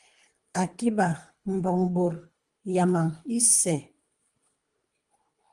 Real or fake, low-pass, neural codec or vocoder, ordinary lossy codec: fake; 10.8 kHz; codec, 24 kHz, 3 kbps, HILCodec; Opus, 32 kbps